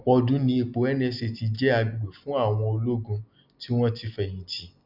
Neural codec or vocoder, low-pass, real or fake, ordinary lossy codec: none; 5.4 kHz; real; none